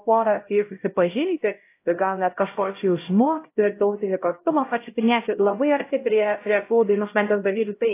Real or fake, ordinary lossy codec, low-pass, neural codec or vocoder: fake; AAC, 24 kbps; 3.6 kHz; codec, 16 kHz, 0.5 kbps, X-Codec, HuBERT features, trained on LibriSpeech